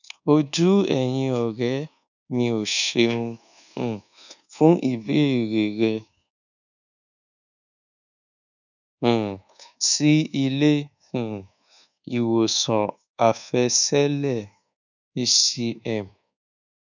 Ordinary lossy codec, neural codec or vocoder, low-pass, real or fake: none; codec, 24 kHz, 1.2 kbps, DualCodec; 7.2 kHz; fake